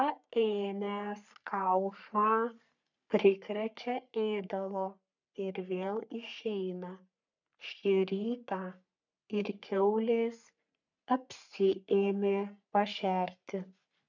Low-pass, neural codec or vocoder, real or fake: 7.2 kHz; codec, 44.1 kHz, 3.4 kbps, Pupu-Codec; fake